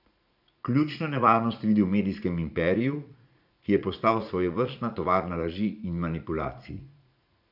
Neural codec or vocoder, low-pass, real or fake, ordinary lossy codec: vocoder, 44.1 kHz, 80 mel bands, Vocos; 5.4 kHz; fake; none